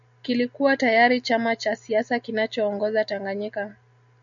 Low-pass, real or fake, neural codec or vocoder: 7.2 kHz; real; none